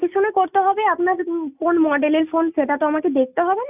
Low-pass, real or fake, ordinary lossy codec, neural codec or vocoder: 3.6 kHz; real; none; none